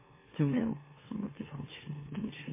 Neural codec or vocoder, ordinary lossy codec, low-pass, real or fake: autoencoder, 44.1 kHz, a latent of 192 numbers a frame, MeloTTS; MP3, 16 kbps; 3.6 kHz; fake